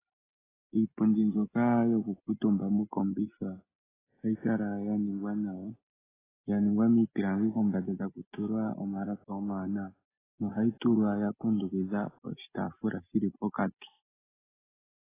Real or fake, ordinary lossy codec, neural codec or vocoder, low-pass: real; AAC, 16 kbps; none; 3.6 kHz